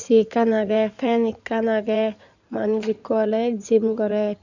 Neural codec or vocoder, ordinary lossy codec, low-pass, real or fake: codec, 16 kHz in and 24 kHz out, 2.2 kbps, FireRedTTS-2 codec; none; 7.2 kHz; fake